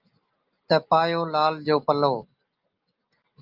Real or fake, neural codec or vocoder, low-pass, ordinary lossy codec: real; none; 5.4 kHz; Opus, 24 kbps